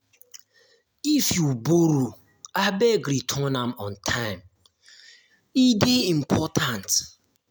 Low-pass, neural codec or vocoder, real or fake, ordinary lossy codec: none; none; real; none